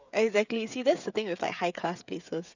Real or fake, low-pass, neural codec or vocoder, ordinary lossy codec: fake; 7.2 kHz; codec, 16 kHz, 16 kbps, FreqCodec, smaller model; none